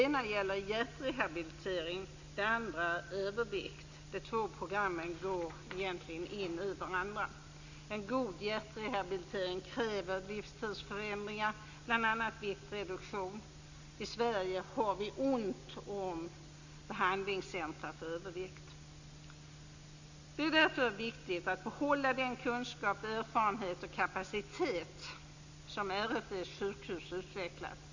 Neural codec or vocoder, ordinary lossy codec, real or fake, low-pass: none; Opus, 64 kbps; real; 7.2 kHz